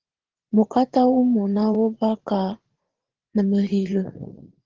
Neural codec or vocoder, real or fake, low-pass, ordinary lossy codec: vocoder, 44.1 kHz, 80 mel bands, Vocos; fake; 7.2 kHz; Opus, 16 kbps